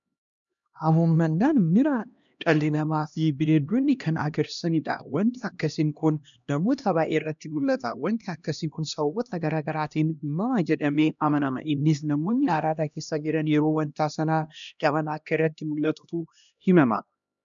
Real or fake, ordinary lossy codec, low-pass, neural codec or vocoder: fake; MP3, 96 kbps; 7.2 kHz; codec, 16 kHz, 1 kbps, X-Codec, HuBERT features, trained on LibriSpeech